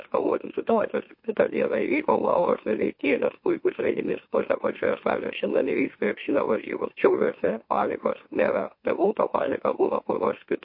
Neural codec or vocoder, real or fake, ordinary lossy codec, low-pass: autoencoder, 44.1 kHz, a latent of 192 numbers a frame, MeloTTS; fake; MP3, 32 kbps; 5.4 kHz